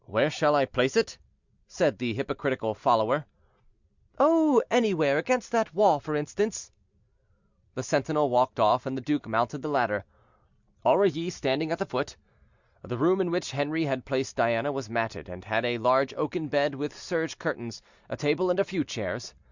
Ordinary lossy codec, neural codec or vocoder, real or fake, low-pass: Opus, 64 kbps; none; real; 7.2 kHz